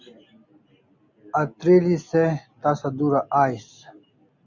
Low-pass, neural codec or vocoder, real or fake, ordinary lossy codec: 7.2 kHz; none; real; Opus, 64 kbps